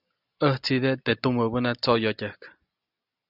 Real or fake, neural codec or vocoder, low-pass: real; none; 5.4 kHz